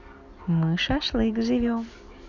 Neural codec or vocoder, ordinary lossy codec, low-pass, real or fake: none; none; 7.2 kHz; real